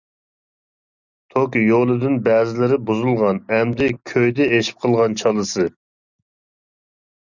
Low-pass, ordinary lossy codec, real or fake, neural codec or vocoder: 7.2 kHz; Opus, 64 kbps; real; none